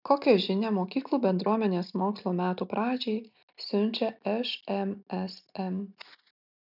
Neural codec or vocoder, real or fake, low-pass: none; real; 5.4 kHz